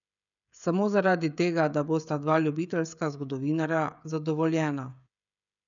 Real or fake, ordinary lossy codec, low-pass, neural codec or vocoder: fake; none; 7.2 kHz; codec, 16 kHz, 16 kbps, FreqCodec, smaller model